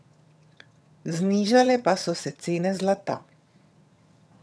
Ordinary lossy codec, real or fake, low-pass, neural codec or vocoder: none; fake; none; vocoder, 22.05 kHz, 80 mel bands, HiFi-GAN